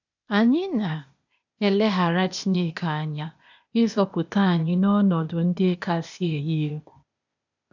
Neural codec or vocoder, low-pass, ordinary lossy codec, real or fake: codec, 16 kHz, 0.8 kbps, ZipCodec; 7.2 kHz; none; fake